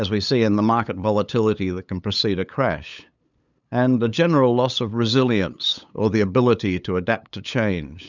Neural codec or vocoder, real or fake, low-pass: codec, 16 kHz, 8 kbps, FunCodec, trained on LibriTTS, 25 frames a second; fake; 7.2 kHz